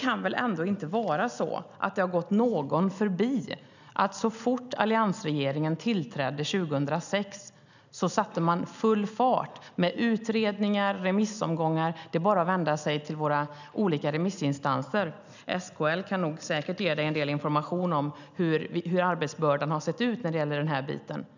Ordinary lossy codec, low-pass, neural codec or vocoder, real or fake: none; 7.2 kHz; none; real